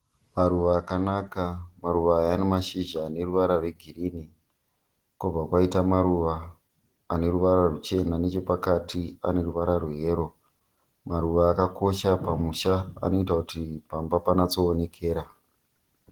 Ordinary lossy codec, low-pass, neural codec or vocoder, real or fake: Opus, 16 kbps; 19.8 kHz; none; real